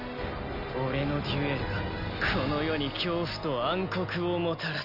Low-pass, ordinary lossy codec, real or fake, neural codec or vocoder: 5.4 kHz; none; real; none